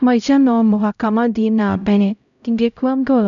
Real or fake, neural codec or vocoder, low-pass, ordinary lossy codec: fake; codec, 16 kHz, 0.5 kbps, X-Codec, HuBERT features, trained on LibriSpeech; 7.2 kHz; none